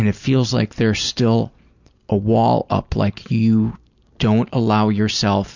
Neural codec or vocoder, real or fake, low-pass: none; real; 7.2 kHz